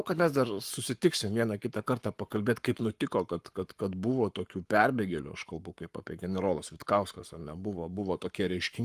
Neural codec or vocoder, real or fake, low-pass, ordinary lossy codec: codec, 44.1 kHz, 7.8 kbps, Pupu-Codec; fake; 14.4 kHz; Opus, 32 kbps